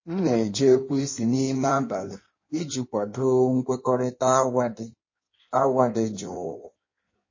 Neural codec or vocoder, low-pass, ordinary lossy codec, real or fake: codec, 16 kHz in and 24 kHz out, 1.1 kbps, FireRedTTS-2 codec; 7.2 kHz; MP3, 32 kbps; fake